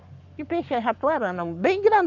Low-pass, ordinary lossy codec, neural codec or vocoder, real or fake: 7.2 kHz; none; codec, 44.1 kHz, 7.8 kbps, Pupu-Codec; fake